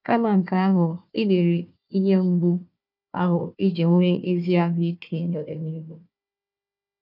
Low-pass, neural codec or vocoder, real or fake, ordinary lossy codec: 5.4 kHz; codec, 16 kHz, 1 kbps, FunCodec, trained on Chinese and English, 50 frames a second; fake; none